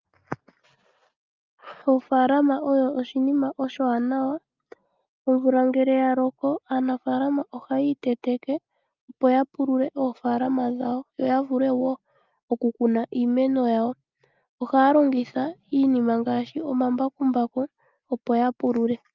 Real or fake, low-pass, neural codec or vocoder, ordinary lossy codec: real; 7.2 kHz; none; Opus, 24 kbps